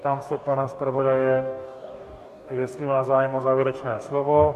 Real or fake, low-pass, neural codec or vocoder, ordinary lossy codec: fake; 14.4 kHz; codec, 44.1 kHz, 2.6 kbps, DAC; MP3, 64 kbps